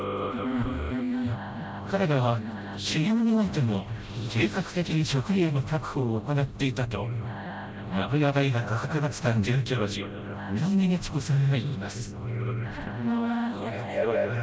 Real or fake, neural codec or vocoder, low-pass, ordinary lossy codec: fake; codec, 16 kHz, 0.5 kbps, FreqCodec, smaller model; none; none